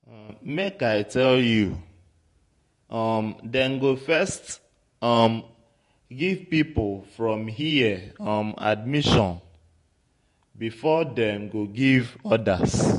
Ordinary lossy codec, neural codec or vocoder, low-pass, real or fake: MP3, 48 kbps; vocoder, 48 kHz, 128 mel bands, Vocos; 14.4 kHz; fake